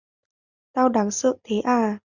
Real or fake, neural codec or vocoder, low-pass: real; none; 7.2 kHz